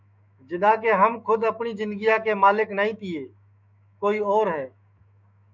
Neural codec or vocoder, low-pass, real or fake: autoencoder, 48 kHz, 128 numbers a frame, DAC-VAE, trained on Japanese speech; 7.2 kHz; fake